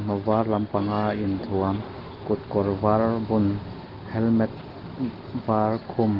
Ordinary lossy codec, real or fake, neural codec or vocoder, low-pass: Opus, 16 kbps; real; none; 5.4 kHz